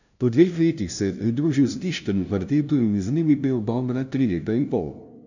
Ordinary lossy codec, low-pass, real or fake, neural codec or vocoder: none; 7.2 kHz; fake; codec, 16 kHz, 0.5 kbps, FunCodec, trained on LibriTTS, 25 frames a second